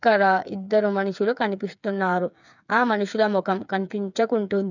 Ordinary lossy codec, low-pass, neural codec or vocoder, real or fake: none; 7.2 kHz; codec, 16 kHz, 8 kbps, FreqCodec, smaller model; fake